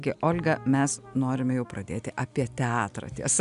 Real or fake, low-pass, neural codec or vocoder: real; 10.8 kHz; none